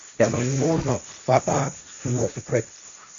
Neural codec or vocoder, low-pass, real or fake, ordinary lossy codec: codec, 16 kHz, 1.1 kbps, Voila-Tokenizer; 7.2 kHz; fake; MP3, 48 kbps